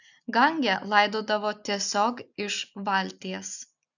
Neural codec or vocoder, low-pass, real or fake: none; 7.2 kHz; real